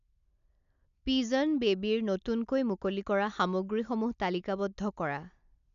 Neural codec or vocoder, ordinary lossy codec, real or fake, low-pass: none; none; real; 7.2 kHz